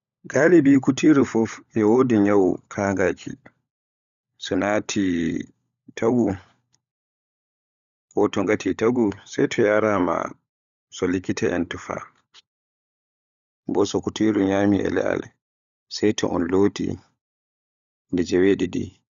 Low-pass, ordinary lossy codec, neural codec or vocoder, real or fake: 7.2 kHz; none; codec, 16 kHz, 16 kbps, FunCodec, trained on LibriTTS, 50 frames a second; fake